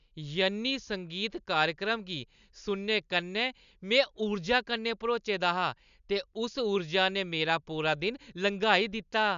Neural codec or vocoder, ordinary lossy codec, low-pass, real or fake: none; none; 7.2 kHz; real